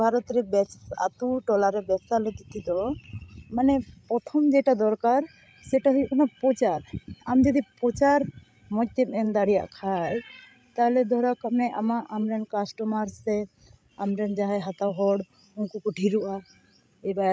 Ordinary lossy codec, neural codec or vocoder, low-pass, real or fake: none; codec, 16 kHz, 16 kbps, FreqCodec, larger model; none; fake